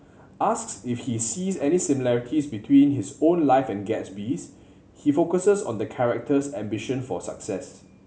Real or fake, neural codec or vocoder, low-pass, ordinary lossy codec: real; none; none; none